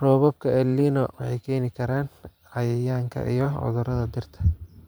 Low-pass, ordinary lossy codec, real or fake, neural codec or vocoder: none; none; fake; vocoder, 44.1 kHz, 128 mel bands every 512 samples, BigVGAN v2